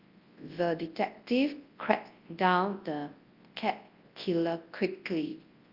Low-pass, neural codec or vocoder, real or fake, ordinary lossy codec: 5.4 kHz; codec, 24 kHz, 0.9 kbps, WavTokenizer, large speech release; fake; Opus, 32 kbps